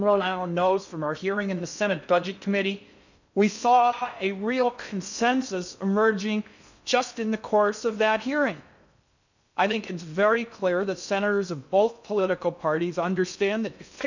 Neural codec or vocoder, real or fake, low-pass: codec, 16 kHz in and 24 kHz out, 0.6 kbps, FocalCodec, streaming, 2048 codes; fake; 7.2 kHz